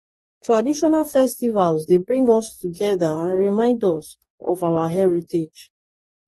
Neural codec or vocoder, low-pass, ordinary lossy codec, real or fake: codec, 44.1 kHz, 2.6 kbps, DAC; 19.8 kHz; AAC, 32 kbps; fake